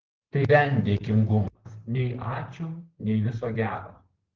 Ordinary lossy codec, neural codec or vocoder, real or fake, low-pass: Opus, 16 kbps; vocoder, 44.1 kHz, 128 mel bands, Pupu-Vocoder; fake; 7.2 kHz